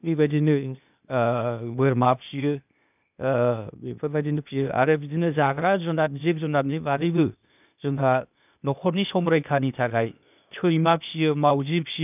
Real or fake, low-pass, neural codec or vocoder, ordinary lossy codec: fake; 3.6 kHz; codec, 16 kHz, 0.8 kbps, ZipCodec; none